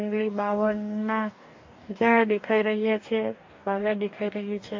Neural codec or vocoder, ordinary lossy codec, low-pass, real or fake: codec, 44.1 kHz, 2.6 kbps, DAC; MP3, 48 kbps; 7.2 kHz; fake